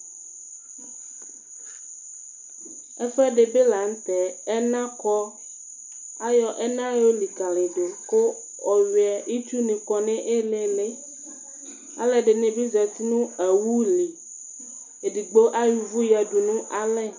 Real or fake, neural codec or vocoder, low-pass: real; none; 7.2 kHz